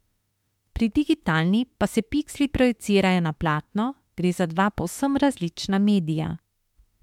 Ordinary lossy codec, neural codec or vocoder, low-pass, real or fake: MP3, 96 kbps; autoencoder, 48 kHz, 32 numbers a frame, DAC-VAE, trained on Japanese speech; 19.8 kHz; fake